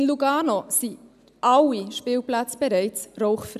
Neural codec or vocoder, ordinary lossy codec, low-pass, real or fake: none; none; 14.4 kHz; real